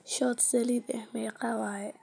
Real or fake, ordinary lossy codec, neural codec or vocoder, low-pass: real; none; none; 9.9 kHz